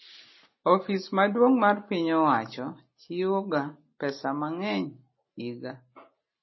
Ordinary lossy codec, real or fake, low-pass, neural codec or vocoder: MP3, 24 kbps; real; 7.2 kHz; none